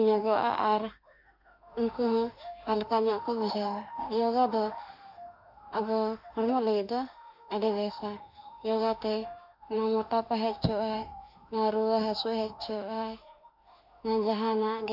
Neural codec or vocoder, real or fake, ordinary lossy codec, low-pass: autoencoder, 48 kHz, 32 numbers a frame, DAC-VAE, trained on Japanese speech; fake; none; 5.4 kHz